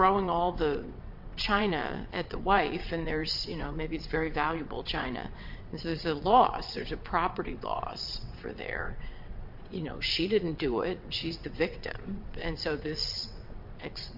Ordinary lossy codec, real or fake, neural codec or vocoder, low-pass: MP3, 48 kbps; fake; vocoder, 22.05 kHz, 80 mel bands, WaveNeXt; 5.4 kHz